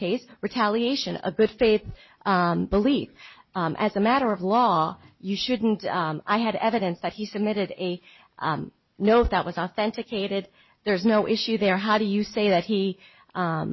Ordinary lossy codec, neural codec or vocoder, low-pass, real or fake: MP3, 24 kbps; none; 7.2 kHz; real